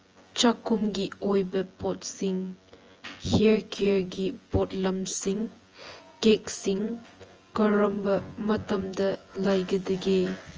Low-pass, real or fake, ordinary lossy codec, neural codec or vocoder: 7.2 kHz; fake; Opus, 24 kbps; vocoder, 24 kHz, 100 mel bands, Vocos